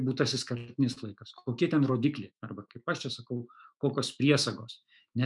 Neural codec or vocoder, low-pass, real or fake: autoencoder, 48 kHz, 128 numbers a frame, DAC-VAE, trained on Japanese speech; 10.8 kHz; fake